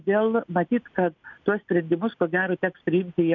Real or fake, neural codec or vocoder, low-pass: real; none; 7.2 kHz